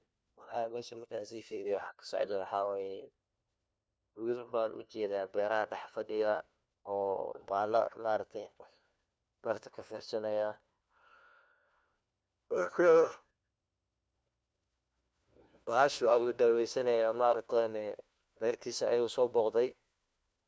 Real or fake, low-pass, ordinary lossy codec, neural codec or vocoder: fake; none; none; codec, 16 kHz, 1 kbps, FunCodec, trained on LibriTTS, 50 frames a second